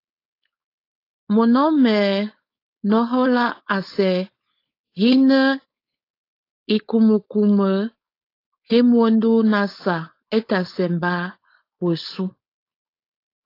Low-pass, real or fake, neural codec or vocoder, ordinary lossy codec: 5.4 kHz; fake; codec, 16 kHz, 4.8 kbps, FACodec; AAC, 32 kbps